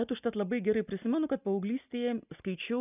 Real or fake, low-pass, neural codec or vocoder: real; 3.6 kHz; none